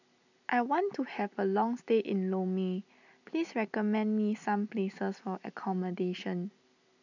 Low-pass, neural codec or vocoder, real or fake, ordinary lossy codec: 7.2 kHz; none; real; none